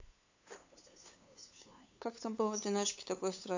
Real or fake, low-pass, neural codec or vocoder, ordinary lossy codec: fake; 7.2 kHz; codec, 16 kHz, 8 kbps, FunCodec, trained on LibriTTS, 25 frames a second; AAC, 48 kbps